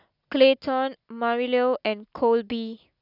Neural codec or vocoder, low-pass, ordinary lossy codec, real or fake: none; 5.4 kHz; none; real